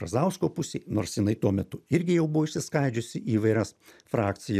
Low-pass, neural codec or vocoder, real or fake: 14.4 kHz; none; real